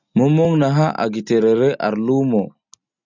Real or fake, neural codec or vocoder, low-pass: real; none; 7.2 kHz